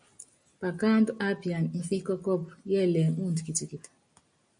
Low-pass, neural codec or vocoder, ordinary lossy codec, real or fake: 9.9 kHz; none; MP3, 64 kbps; real